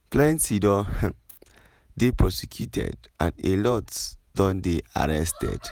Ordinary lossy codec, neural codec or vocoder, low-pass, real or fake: none; vocoder, 48 kHz, 128 mel bands, Vocos; none; fake